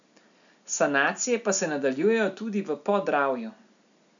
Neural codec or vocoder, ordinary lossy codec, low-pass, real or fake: none; none; 7.2 kHz; real